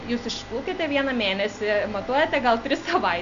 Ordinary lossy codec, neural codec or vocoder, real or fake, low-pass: AAC, 96 kbps; none; real; 7.2 kHz